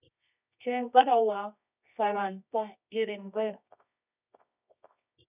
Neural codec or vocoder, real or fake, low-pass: codec, 24 kHz, 0.9 kbps, WavTokenizer, medium music audio release; fake; 3.6 kHz